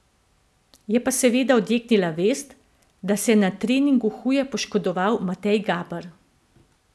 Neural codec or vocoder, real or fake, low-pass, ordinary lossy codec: none; real; none; none